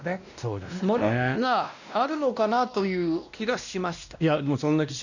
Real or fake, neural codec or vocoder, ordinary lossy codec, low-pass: fake; codec, 16 kHz, 1 kbps, X-Codec, WavLM features, trained on Multilingual LibriSpeech; none; 7.2 kHz